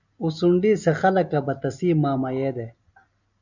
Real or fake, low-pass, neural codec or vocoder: real; 7.2 kHz; none